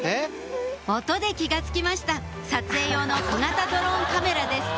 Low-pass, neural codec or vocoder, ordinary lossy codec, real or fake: none; none; none; real